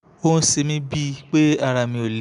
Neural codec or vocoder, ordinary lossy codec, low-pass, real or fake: none; none; 10.8 kHz; real